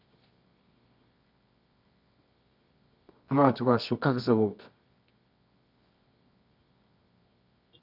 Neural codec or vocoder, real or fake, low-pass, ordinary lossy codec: codec, 24 kHz, 0.9 kbps, WavTokenizer, medium music audio release; fake; 5.4 kHz; none